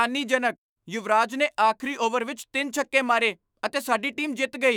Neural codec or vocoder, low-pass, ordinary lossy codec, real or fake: autoencoder, 48 kHz, 128 numbers a frame, DAC-VAE, trained on Japanese speech; none; none; fake